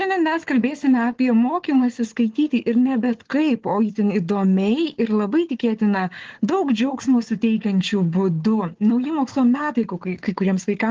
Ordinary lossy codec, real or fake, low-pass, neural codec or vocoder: Opus, 16 kbps; fake; 7.2 kHz; codec, 16 kHz, 4 kbps, FunCodec, trained on Chinese and English, 50 frames a second